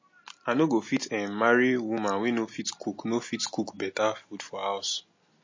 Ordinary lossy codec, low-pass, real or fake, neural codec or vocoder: MP3, 32 kbps; 7.2 kHz; real; none